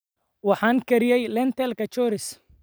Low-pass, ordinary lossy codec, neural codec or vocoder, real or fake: none; none; none; real